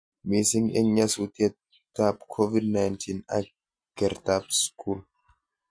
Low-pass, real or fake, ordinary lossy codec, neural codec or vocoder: 9.9 kHz; real; MP3, 48 kbps; none